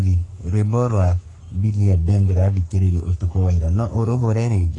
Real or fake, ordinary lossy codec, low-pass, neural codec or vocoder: fake; AAC, 48 kbps; 10.8 kHz; codec, 44.1 kHz, 3.4 kbps, Pupu-Codec